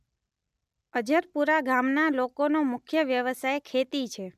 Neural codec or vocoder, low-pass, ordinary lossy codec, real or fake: none; 14.4 kHz; none; real